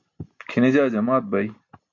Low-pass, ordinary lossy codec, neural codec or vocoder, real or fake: 7.2 kHz; MP3, 48 kbps; none; real